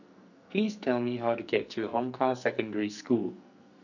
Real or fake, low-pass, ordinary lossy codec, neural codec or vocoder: fake; 7.2 kHz; none; codec, 44.1 kHz, 2.6 kbps, SNAC